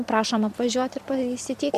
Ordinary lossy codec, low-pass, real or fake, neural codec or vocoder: Opus, 64 kbps; 14.4 kHz; fake; vocoder, 44.1 kHz, 128 mel bands every 256 samples, BigVGAN v2